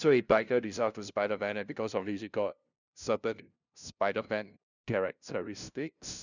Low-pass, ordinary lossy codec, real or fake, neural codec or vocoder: 7.2 kHz; none; fake; codec, 16 kHz, 0.5 kbps, FunCodec, trained on LibriTTS, 25 frames a second